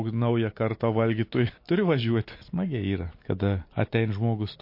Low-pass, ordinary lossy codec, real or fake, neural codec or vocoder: 5.4 kHz; MP3, 32 kbps; real; none